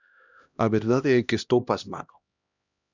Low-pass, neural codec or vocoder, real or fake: 7.2 kHz; codec, 16 kHz, 1 kbps, X-Codec, HuBERT features, trained on LibriSpeech; fake